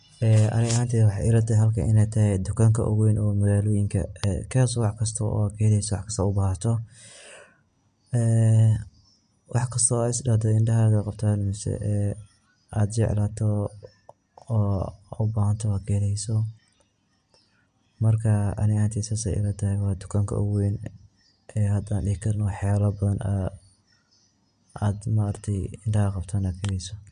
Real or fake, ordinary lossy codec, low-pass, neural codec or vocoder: real; MP3, 64 kbps; 9.9 kHz; none